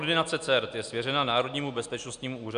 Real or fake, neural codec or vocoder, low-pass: real; none; 9.9 kHz